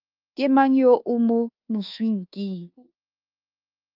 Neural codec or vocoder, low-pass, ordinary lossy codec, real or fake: codec, 24 kHz, 1.2 kbps, DualCodec; 5.4 kHz; Opus, 32 kbps; fake